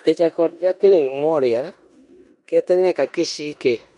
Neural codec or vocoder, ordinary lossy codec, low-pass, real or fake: codec, 16 kHz in and 24 kHz out, 0.9 kbps, LongCat-Audio-Codec, four codebook decoder; none; 10.8 kHz; fake